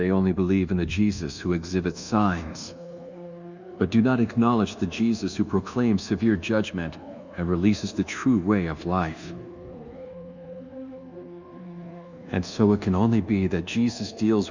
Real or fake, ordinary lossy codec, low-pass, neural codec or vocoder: fake; Opus, 64 kbps; 7.2 kHz; codec, 24 kHz, 1.2 kbps, DualCodec